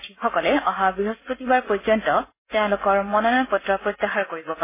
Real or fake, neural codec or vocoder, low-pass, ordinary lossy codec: real; none; 3.6 kHz; MP3, 16 kbps